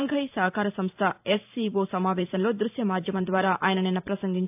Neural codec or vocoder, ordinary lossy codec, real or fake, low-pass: vocoder, 44.1 kHz, 128 mel bands every 512 samples, BigVGAN v2; AAC, 32 kbps; fake; 3.6 kHz